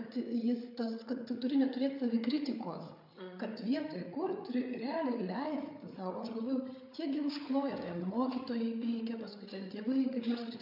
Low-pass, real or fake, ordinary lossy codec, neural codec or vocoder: 5.4 kHz; fake; MP3, 48 kbps; codec, 16 kHz, 8 kbps, FreqCodec, larger model